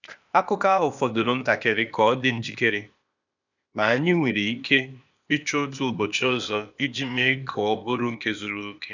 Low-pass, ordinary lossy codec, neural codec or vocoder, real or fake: 7.2 kHz; none; codec, 16 kHz, 0.8 kbps, ZipCodec; fake